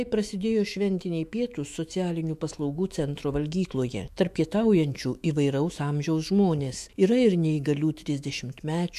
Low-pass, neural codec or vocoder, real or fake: 14.4 kHz; autoencoder, 48 kHz, 128 numbers a frame, DAC-VAE, trained on Japanese speech; fake